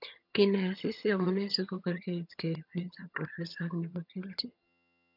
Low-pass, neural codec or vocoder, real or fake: 5.4 kHz; vocoder, 22.05 kHz, 80 mel bands, HiFi-GAN; fake